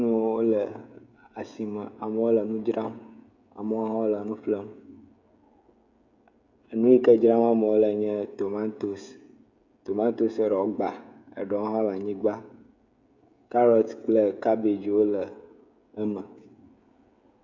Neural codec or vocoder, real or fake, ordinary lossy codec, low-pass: codec, 16 kHz, 16 kbps, FreqCodec, smaller model; fake; Opus, 64 kbps; 7.2 kHz